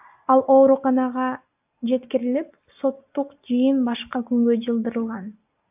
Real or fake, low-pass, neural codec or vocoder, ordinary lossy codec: real; 3.6 kHz; none; AAC, 32 kbps